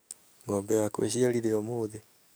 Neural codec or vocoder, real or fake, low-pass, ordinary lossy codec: codec, 44.1 kHz, 7.8 kbps, DAC; fake; none; none